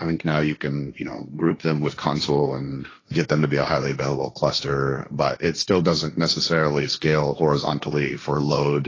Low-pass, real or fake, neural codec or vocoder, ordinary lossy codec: 7.2 kHz; fake; codec, 16 kHz, 1.1 kbps, Voila-Tokenizer; AAC, 32 kbps